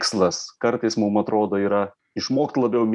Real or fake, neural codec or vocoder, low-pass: real; none; 10.8 kHz